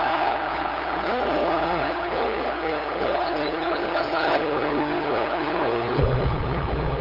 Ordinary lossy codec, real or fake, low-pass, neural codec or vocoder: none; fake; 5.4 kHz; codec, 16 kHz, 8 kbps, FunCodec, trained on LibriTTS, 25 frames a second